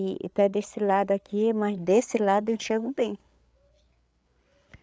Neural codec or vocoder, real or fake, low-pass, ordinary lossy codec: codec, 16 kHz, 8 kbps, FreqCodec, larger model; fake; none; none